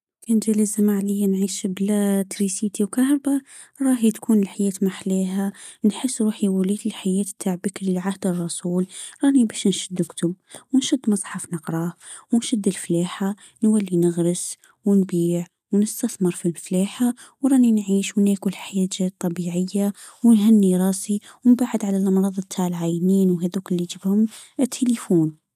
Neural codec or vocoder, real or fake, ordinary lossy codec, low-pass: none; real; none; 14.4 kHz